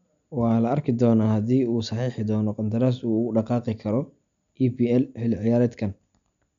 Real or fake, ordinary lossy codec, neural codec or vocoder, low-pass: real; none; none; 7.2 kHz